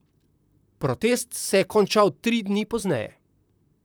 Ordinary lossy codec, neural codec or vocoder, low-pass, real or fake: none; vocoder, 44.1 kHz, 128 mel bands, Pupu-Vocoder; none; fake